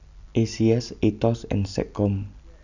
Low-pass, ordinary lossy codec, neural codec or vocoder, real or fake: 7.2 kHz; none; none; real